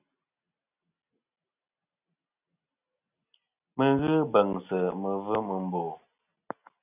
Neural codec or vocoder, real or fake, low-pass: none; real; 3.6 kHz